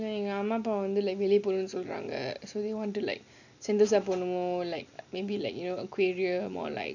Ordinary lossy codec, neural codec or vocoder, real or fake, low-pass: none; none; real; 7.2 kHz